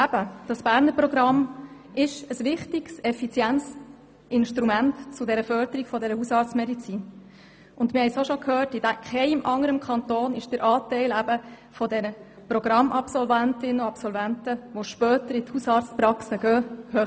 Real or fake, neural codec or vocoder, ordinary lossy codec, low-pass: real; none; none; none